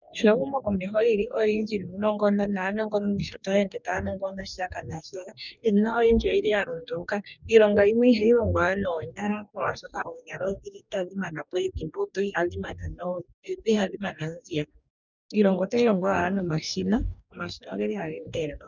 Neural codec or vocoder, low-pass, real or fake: codec, 44.1 kHz, 2.6 kbps, DAC; 7.2 kHz; fake